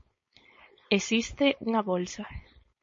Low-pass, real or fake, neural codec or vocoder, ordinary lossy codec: 7.2 kHz; fake; codec, 16 kHz, 4.8 kbps, FACodec; MP3, 32 kbps